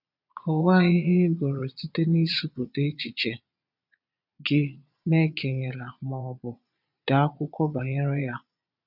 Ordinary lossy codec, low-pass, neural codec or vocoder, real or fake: none; 5.4 kHz; vocoder, 22.05 kHz, 80 mel bands, Vocos; fake